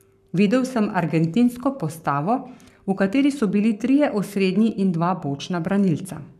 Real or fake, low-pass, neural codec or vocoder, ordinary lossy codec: fake; 14.4 kHz; codec, 44.1 kHz, 7.8 kbps, Pupu-Codec; none